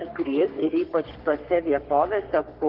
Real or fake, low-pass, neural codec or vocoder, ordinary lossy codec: fake; 5.4 kHz; codec, 44.1 kHz, 3.4 kbps, Pupu-Codec; Opus, 16 kbps